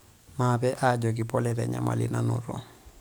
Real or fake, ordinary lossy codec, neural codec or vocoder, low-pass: fake; none; codec, 44.1 kHz, 7.8 kbps, DAC; none